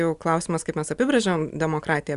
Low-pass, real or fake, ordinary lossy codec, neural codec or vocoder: 10.8 kHz; real; Opus, 64 kbps; none